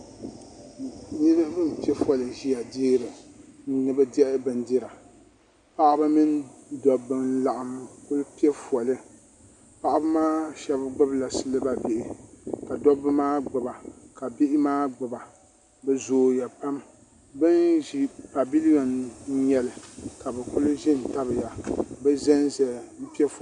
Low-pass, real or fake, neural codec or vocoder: 10.8 kHz; real; none